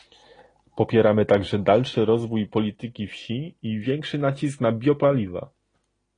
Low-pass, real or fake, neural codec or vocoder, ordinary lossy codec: 9.9 kHz; real; none; AAC, 32 kbps